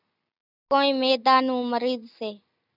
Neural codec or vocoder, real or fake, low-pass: none; real; 5.4 kHz